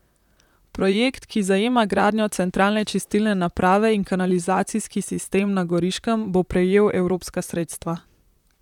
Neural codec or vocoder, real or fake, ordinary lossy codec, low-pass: vocoder, 44.1 kHz, 128 mel bands, Pupu-Vocoder; fake; none; 19.8 kHz